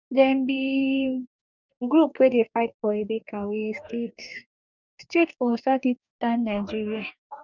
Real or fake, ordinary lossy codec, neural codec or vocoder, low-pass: fake; none; codec, 44.1 kHz, 2.6 kbps, DAC; 7.2 kHz